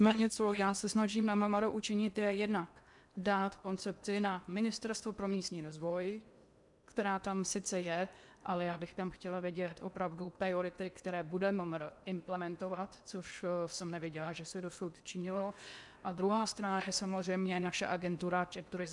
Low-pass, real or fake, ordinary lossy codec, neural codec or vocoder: 10.8 kHz; fake; MP3, 96 kbps; codec, 16 kHz in and 24 kHz out, 0.8 kbps, FocalCodec, streaming, 65536 codes